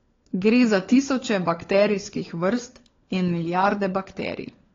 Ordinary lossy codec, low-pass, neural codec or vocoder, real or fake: AAC, 32 kbps; 7.2 kHz; codec, 16 kHz, 4 kbps, FunCodec, trained on LibriTTS, 50 frames a second; fake